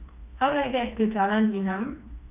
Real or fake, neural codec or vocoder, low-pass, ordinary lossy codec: fake; codec, 16 kHz, 2 kbps, FreqCodec, smaller model; 3.6 kHz; none